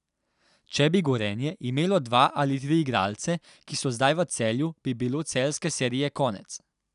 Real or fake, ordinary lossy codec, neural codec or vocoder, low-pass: real; none; none; 10.8 kHz